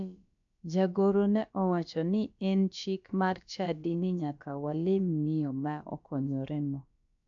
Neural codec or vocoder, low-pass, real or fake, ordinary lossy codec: codec, 16 kHz, about 1 kbps, DyCAST, with the encoder's durations; 7.2 kHz; fake; AAC, 64 kbps